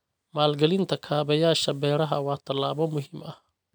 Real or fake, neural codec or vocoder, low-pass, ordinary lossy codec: real; none; none; none